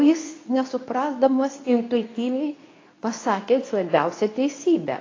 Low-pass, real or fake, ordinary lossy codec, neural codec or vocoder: 7.2 kHz; fake; AAC, 32 kbps; codec, 24 kHz, 0.9 kbps, WavTokenizer, medium speech release version 2